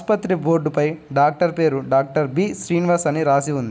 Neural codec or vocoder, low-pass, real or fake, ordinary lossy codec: none; none; real; none